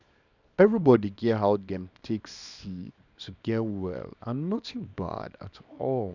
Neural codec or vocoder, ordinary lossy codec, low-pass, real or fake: codec, 24 kHz, 0.9 kbps, WavTokenizer, small release; none; 7.2 kHz; fake